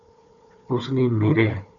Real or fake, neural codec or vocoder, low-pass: fake; codec, 16 kHz, 4 kbps, FunCodec, trained on Chinese and English, 50 frames a second; 7.2 kHz